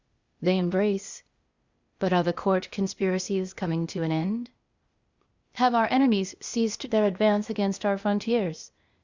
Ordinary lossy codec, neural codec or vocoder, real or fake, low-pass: Opus, 64 kbps; codec, 16 kHz, 0.8 kbps, ZipCodec; fake; 7.2 kHz